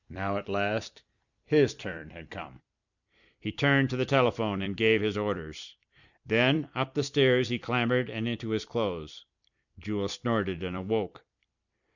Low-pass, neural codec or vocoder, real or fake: 7.2 kHz; vocoder, 44.1 kHz, 128 mel bands every 256 samples, BigVGAN v2; fake